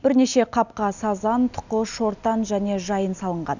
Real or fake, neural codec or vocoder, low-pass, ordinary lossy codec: real; none; 7.2 kHz; none